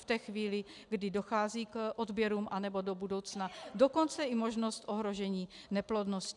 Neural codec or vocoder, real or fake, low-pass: none; real; 10.8 kHz